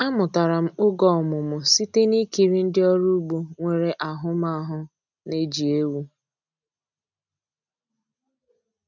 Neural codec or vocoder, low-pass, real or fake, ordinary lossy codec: none; 7.2 kHz; real; none